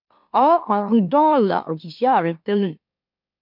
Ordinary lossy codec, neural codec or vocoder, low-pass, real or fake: MP3, 48 kbps; autoencoder, 44.1 kHz, a latent of 192 numbers a frame, MeloTTS; 5.4 kHz; fake